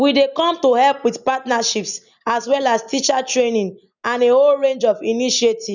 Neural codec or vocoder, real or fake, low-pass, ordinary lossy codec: none; real; 7.2 kHz; none